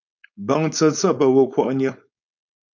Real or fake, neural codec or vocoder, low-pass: fake; codec, 16 kHz, 4.8 kbps, FACodec; 7.2 kHz